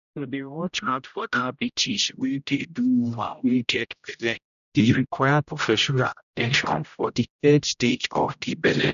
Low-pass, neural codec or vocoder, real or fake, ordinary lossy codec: 7.2 kHz; codec, 16 kHz, 0.5 kbps, X-Codec, HuBERT features, trained on general audio; fake; none